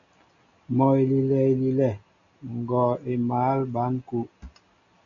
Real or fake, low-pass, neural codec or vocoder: real; 7.2 kHz; none